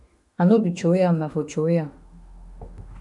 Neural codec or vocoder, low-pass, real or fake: autoencoder, 48 kHz, 32 numbers a frame, DAC-VAE, trained on Japanese speech; 10.8 kHz; fake